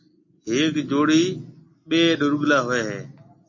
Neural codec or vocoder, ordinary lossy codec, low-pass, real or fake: none; MP3, 32 kbps; 7.2 kHz; real